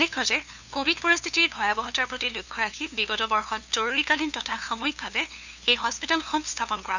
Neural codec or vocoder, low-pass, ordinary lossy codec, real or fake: codec, 16 kHz, 2 kbps, FunCodec, trained on LibriTTS, 25 frames a second; 7.2 kHz; none; fake